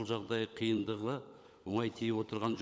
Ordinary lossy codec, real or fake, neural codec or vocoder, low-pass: none; fake; codec, 16 kHz, 16 kbps, FunCodec, trained on LibriTTS, 50 frames a second; none